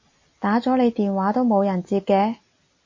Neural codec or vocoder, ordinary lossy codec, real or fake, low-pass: none; MP3, 32 kbps; real; 7.2 kHz